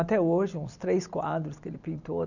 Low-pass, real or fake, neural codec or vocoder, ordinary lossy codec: 7.2 kHz; real; none; none